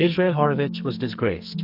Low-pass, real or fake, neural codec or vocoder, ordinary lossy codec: 5.4 kHz; fake; codec, 44.1 kHz, 2.6 kbps, SNAC; AAC, 48 kbps